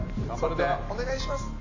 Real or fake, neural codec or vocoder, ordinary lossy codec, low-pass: real; none; MP3, 32 kbps; 7.2 kHz